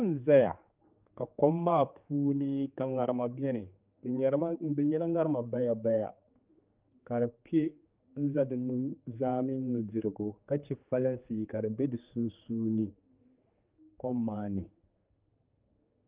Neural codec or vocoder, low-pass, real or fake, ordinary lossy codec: codec, 16 kHz, 4 kbps, X-Codec, HuBERT features, trained on general audio; 3.6 kHz; fake; Opus, 32 kbps